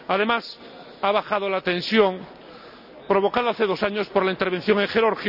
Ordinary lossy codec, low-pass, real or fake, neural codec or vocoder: none; 5.4 kHz; real; none